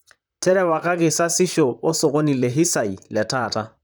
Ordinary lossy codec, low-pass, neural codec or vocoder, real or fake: none; none; vocoder, 44.1 kHz, 128 mel bands, Pupu-Vocoder; fake